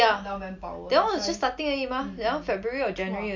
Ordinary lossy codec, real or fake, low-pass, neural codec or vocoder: MP3, 48 kbps; real; 7.2 kHz; none